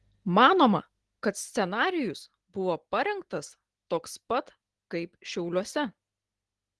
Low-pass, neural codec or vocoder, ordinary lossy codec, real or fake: 10.8 kHz; none; Opus, 16 kbps; real